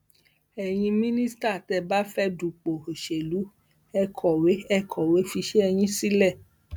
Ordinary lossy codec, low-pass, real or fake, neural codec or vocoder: none; none; real; none